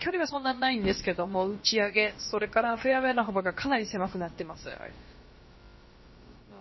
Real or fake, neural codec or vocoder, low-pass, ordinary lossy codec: fake; codec, 16 kHz, about 1 kbps, DyCAST, with the encoder's durations; 7.2 kHz; MP3, 24 kbps